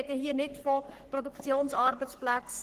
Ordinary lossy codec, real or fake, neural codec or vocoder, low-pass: Opus, 16 kbps; fake; codec, 44.1 kHz, 3.4 kbps, Pupu-Codec; 14.4 kHz